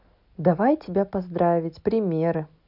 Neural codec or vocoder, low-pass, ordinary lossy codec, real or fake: none; 5.4 kHz; none; real